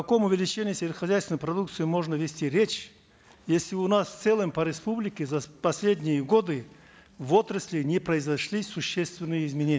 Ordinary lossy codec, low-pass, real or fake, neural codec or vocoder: none; none; real; none